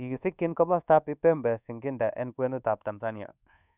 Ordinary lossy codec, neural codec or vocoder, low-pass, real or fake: none; codec, 24 kHz, 1.2 kbps, DualCodec; 3.6 kHz; fake